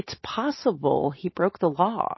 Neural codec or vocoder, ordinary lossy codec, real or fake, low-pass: none; MP3, 24 kbps; real; 7.2 kHz